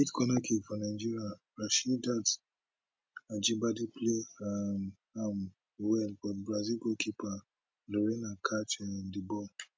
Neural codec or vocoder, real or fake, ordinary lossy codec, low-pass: none; real; none; none